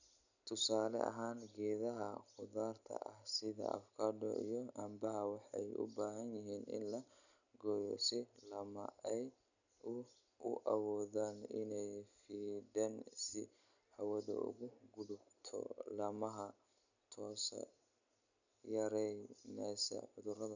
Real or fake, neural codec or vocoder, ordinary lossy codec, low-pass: real; none; none; 7.2 kHz